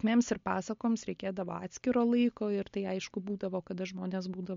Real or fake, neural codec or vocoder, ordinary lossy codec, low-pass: real; none; MP3, 48 kbps; 7.2 kHz